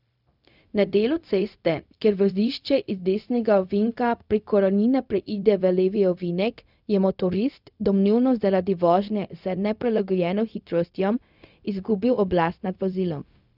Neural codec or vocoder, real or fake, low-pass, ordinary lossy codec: codec, 16 kHz, 0.4 kbps, LongCat-Audio-Codec; fake; 5.4 kHz; none